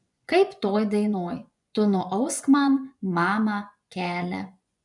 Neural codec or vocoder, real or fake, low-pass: vocoder, 24 kHz, 100 mel bands, Vocos; fake; 10.8 kHz